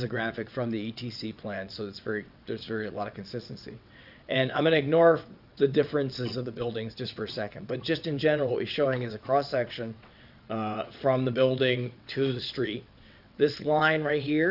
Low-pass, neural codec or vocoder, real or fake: 5.4 kHz; vocoder, 22.05 kHz, 80 mel bands, WaveNeXt; fake